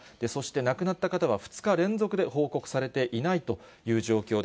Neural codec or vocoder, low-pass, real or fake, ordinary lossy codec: none; none; real; none